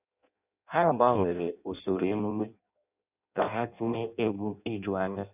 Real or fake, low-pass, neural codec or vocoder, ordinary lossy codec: fake; 3.6 kHz; codec, 16 kHz in and 24 kHz out, 0.6 kbps, FireRedTTS-2 codec; none